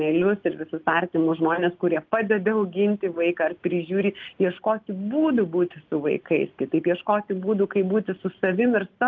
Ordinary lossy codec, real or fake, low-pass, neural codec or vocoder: Opus, 32 kbps; real; 7.2 kHz; none